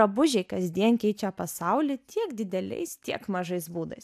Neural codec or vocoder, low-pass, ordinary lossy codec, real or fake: none; 14.4 kHz; AAC, 96 kbps; real